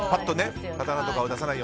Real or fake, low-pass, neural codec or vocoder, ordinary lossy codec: real; none; none; none